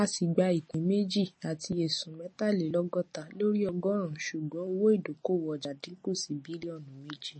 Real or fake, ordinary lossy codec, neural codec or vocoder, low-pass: real; MP3, 32 kbps; none; 9.9 kHz